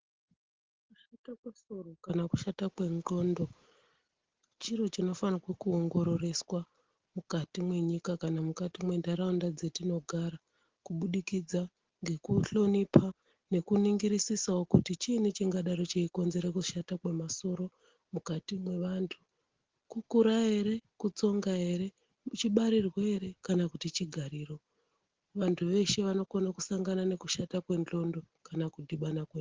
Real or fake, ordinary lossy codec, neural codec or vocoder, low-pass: real; Opus, 16 kbps; none; 7.2 kHz